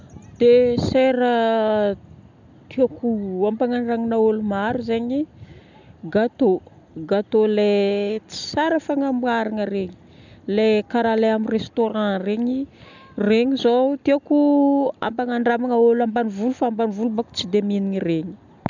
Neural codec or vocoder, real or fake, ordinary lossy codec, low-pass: none; real; none; 7.2 kHz